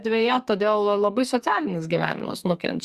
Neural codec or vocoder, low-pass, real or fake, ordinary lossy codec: codec, 44.1 kHz, 2.6 kbps, SNAC; 14.4 kHz; fake; Opus, 64 kbps